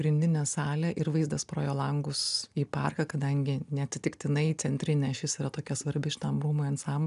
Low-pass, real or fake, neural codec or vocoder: 10.8 kHz; real; none